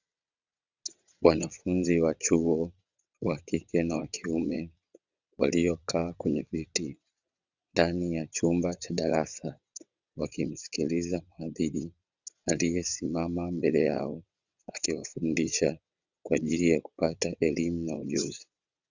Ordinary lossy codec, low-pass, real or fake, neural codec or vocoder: Opus, 64 kbps; 7.2 kHz; fake; vocoder, 22.05 kHz, 80 mel bands, WaveNeXt